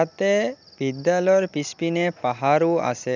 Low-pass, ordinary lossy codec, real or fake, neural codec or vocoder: 7.2 kHz; none; real; none